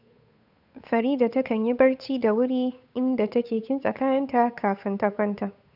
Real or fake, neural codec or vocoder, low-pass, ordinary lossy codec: fake; codec, 16 kHz, 8 kbps, FunCodec, trained on Chinese and English, 25 frames a second; 5.4 kHz; none